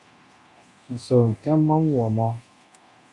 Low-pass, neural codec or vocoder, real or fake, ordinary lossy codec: 10.8 kHz; codec, 24 kHz, 0.9 kbps, DualCodec; fake; Opus, 64 kbps